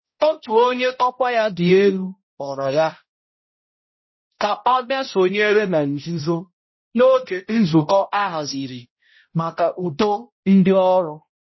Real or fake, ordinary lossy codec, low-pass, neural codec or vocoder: fake; MP3, 24 kbps; 7.2 kHz; codec, 16 kHz, 0.5 kbps, X-Codec, HuBERT features, trained on balanced general audio